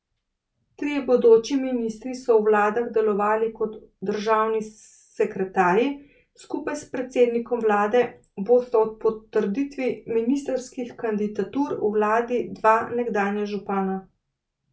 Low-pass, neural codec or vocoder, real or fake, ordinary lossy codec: none; none; real; none